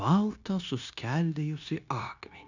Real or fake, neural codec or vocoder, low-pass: fake; codec, 16 kHz, 0.9 kbps, LongCat-Audio-Codec; 7.2 kHz